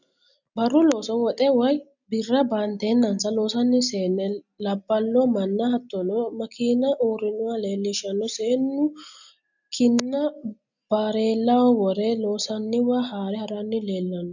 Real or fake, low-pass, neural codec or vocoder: real; 7.2 kHz; none